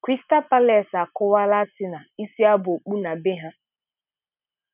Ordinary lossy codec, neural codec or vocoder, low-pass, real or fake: none; none; 3.6 kHz; real